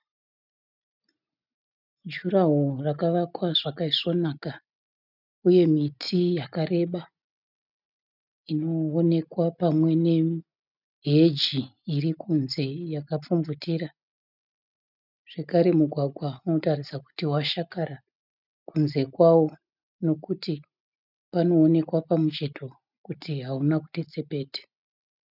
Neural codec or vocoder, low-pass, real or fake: none; 5.4 kHz; real